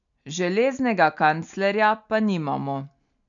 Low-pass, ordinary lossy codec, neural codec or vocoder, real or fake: 7.2 kHz; none; none; real